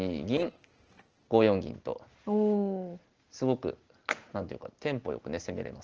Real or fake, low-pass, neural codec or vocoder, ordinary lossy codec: real; 7.2 kHz; none; Opus, 16 kbps